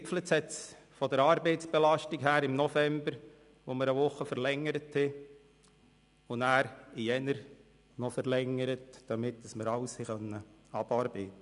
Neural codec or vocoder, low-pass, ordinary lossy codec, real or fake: none; 10.8 kHz; none; real